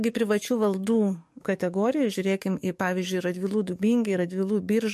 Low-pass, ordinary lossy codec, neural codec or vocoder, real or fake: 14.4 kHz; MP3, 64 kbps; codec, 44.1 kHz, 7.8 kbps, DAC; fake